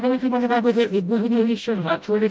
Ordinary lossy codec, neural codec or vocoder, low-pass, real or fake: none; codec, 16 kHz, 0.5 kbps, FreqCodec, smaller model; none; fake